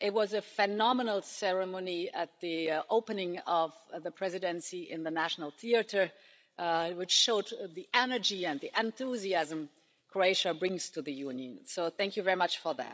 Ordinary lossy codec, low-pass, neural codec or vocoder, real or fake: none; none; codec, 16 kHz, 16 kbps, FreqCodec, larger model; fake